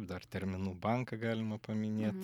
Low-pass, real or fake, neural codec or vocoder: 19.8 kHz; real; none